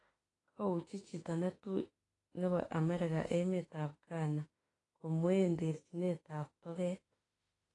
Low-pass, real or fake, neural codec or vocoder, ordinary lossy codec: 10.8 kHz; fake; autoencoder, 48 kHz, 32 numbers a frame, DAC-VAE, trained on Japanese speech; AAC, 32 kbps